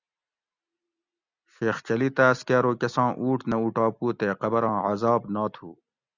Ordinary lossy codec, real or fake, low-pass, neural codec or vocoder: Opus, 64 kbps; real; 7.2 kHz; none